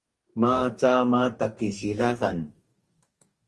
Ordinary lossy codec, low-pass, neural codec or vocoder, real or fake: Opus, 24 kbps; 10.8 kHz; codec, 44.1 kHz, 2.6 kbps, DAC; fake